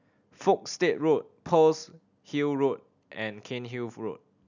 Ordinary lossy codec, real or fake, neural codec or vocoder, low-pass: none; real; none; 7.2 kHz